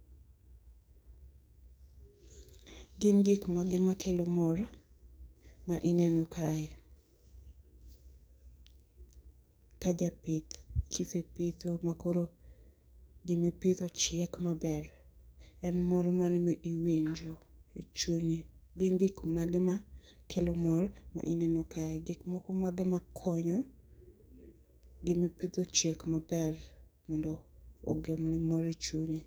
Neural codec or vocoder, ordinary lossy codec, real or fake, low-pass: codec, 44.1 kHz, 2.6 kbps, SNAC; none; fake; none